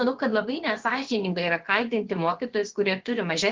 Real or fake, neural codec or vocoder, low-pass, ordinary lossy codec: fake; codec, 16 kHz, about 1 kbps, DyCAST, with the encoder's durations; 7.2 kHz; Opus, 16 kbps